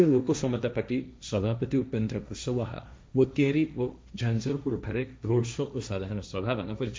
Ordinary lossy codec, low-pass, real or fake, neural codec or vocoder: none; none; fake; codec, 16 kHz, 1.1 kbps, Voila-Tokenizer